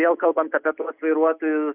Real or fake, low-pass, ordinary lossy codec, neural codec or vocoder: real; 3.6 kHz; Opus, 64 kbps; none